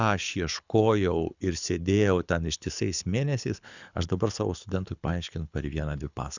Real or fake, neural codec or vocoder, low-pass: fake; codec, 24 kHz, 6 kbps, HILCodec; 7.2 kHz